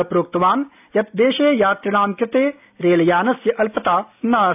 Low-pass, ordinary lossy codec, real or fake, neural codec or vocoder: 3.6 kHz; AAC, 32 kbps; real; none